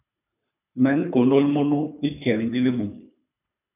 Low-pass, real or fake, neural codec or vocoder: 3.6 kHz; fake; codec, 24 kHz, 3 kbps, HILCodec